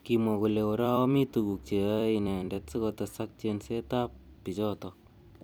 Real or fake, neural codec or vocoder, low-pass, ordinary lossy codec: fake; vocoder, 44.1 kHz, 128 mel bands every 256 samples, BigVGAN v2; none; none